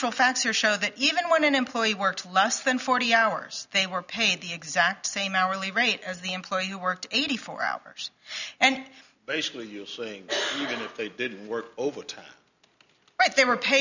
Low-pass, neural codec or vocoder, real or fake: 7.2 kHz; none; real